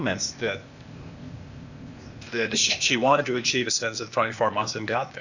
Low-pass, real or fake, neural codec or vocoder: 7.2 kHz; fake; codec, 16 kHz, 0.8 kbps, ZipCodec